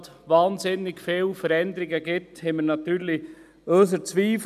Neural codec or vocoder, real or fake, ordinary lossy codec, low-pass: none; real; none; 14.4 kHz